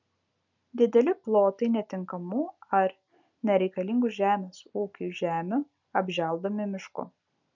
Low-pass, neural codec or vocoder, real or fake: 7.2 kHz; none; real